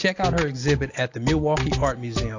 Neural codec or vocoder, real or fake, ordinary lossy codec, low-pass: none; real; AAC, 48 kbps; 7.2 kHz